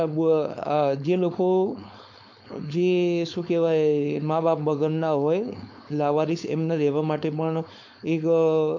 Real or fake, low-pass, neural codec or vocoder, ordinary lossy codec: fake; 7.2 kHz; codec, 16 kHz, 4.8 kbps, FACodec; MP3, 48 kbps